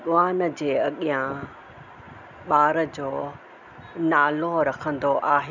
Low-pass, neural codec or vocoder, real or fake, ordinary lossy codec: 7.2 kHz; none; real; none